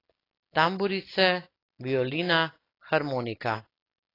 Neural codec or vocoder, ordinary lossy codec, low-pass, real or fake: none; AAC, 24 kbps; 5.4 kHz; real